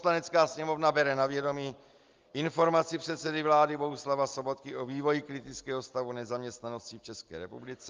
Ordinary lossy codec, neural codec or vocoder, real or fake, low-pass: Opus, 32 kbps; none; real; 7.2 kHz